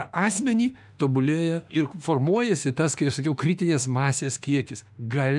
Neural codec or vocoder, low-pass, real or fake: autoencoder, 48 kHz, 32 numbers a frame, DAC-VAE, trained on Japanese speech; 10.8 kHz; fake